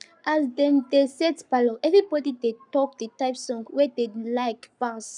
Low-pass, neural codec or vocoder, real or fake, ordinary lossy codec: 10.8 kHz; codec, 44.1 kHz, 7.8 kbps, Pupu-Codec; fake; none